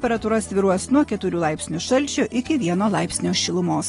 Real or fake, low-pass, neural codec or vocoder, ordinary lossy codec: real; 10.8 kHz; none; AAC, 32 kbps